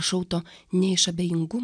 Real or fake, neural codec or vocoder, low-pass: real; none; 9.9 kHz